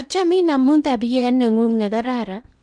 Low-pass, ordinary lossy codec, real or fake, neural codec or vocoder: 9.9 kHz; none; fake; codec, 16 kHz in and 24 kHz out, 0.8 kbps, FocalCodec, streaming, 65536 codes